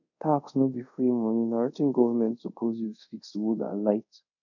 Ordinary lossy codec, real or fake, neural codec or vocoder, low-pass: none; fake; codec, 24 kHz, 0.5 kbps, DualCodec; 7.2 kHz